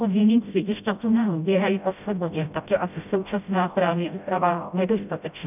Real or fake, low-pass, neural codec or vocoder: fake; 3.6 kHz; codec, 16 kHz, 0.5 kbps, FreqCodec, smaller model